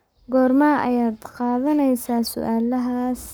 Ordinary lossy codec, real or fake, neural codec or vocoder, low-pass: none; fake; codec, 44.1 kHz, 7.8 kbps, Pupu-Codec; none